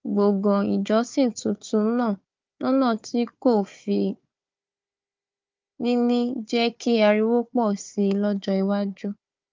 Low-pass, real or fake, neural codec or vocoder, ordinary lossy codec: 7.2 kHz; fake; codec, 16 kHz, 4 kbps, FunCodec, trained on Chinese and English, 50 frames a second; Opus, 32 kbps